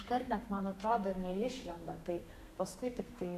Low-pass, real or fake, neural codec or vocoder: 14.4 kHz; fake; codec, 32 kHz, 1.9 kbps, SNAC